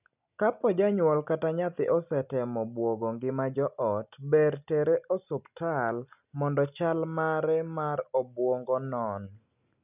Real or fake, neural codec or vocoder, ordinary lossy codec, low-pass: real; none; none; 3.6 kHz